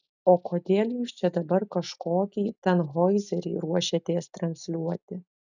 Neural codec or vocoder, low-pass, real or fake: none; 7.2 kHz; real